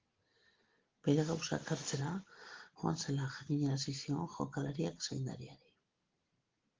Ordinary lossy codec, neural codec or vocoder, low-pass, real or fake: Opus, 16 kbps; none; 7.2 kHz; real